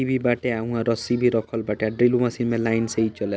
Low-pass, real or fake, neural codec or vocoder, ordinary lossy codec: none; real; none; none